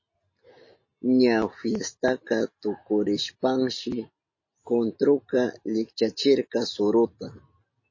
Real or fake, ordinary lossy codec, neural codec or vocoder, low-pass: real; MP3, 32 kbps; none; 7.2 kHz